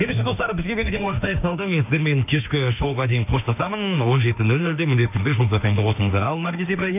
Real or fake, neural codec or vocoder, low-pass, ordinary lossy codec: fake; autoencoder, 48 kHz, 32 numbers a frame, DAC-VAE, trained on Japanese speech; 3.6 kHz; none